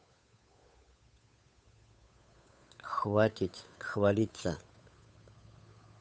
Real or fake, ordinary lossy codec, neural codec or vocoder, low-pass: fake; none; codec, 16 kHz, 8 kbps, FunCodec, trained on Chinese and English, 25 frames a second; none